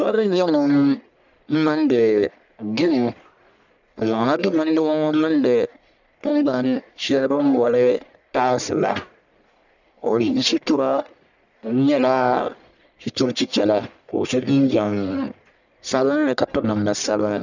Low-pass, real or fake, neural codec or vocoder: 7.2 kHz; fake; codec, 44.1 kHz, 1.7 kbps, Pupu-Codec